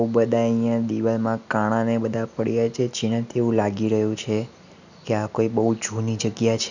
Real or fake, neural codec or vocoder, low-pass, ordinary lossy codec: real; none; 7.2 kHz; none